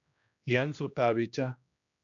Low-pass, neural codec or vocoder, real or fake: 7.2 kHz; codec, 16 kHz, 1 kbps, X-Codec, HuBERT features, trained on general audio; fake